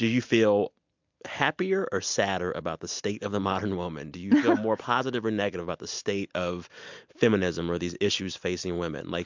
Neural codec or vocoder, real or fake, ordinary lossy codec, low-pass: none; real; MP3, 64 kbps; 7.2 kHz